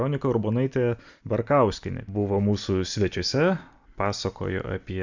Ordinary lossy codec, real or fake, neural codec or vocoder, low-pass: Opus, 64 kbps; real; none; 7.2 kHz